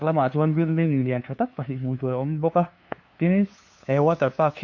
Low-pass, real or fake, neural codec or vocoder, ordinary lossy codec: 7.2 kHz; fake; codec, 16 kHz, 2 kbps, FunCodec, trained on LibriTTS, 25 frames a second; MP3, 48 kbps